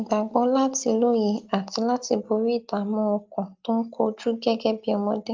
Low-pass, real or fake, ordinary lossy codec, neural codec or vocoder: 7.2 kHz; real; Opus, 24 kbps; none